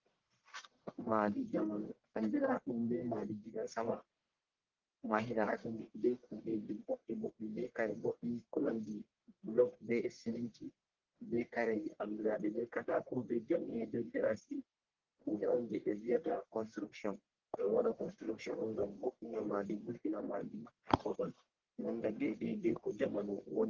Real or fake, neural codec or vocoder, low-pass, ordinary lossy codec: fake; codec, 44.1 kHz, 1.7 kbps, Pupu-Codec; 7.2 kHz; Opus, 16 kbps